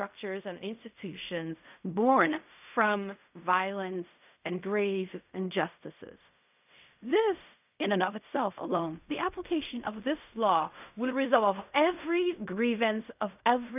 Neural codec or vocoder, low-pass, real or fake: codec, 16 kHz in and 24 kHz out, 0.4 kbps, LongCat-Audio-Codec, fine tuned four codebook decoder; 3.6 kHz; fake